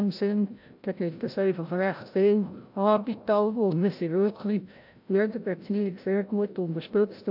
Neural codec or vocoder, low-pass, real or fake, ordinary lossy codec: codec, 16 kHz, 0.5 kbps, FreqCodec, larger model; 5.4 kHz; fake; AAC, 48 kbps